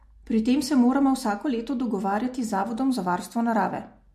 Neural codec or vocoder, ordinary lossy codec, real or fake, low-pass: none; MP3, 64 kbps; real; 14.4 kHz